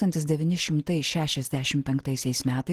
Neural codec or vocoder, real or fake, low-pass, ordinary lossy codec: none; real; 14.4 kHz; Opus, 16 kbps